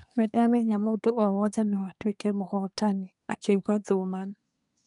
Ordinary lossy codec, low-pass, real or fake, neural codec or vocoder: none; 10.8 kHz; fake; codec, 24 kHz, 1 kbps, SNAC